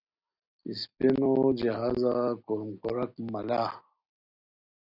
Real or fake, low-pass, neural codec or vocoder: real; 5.4 kHz; none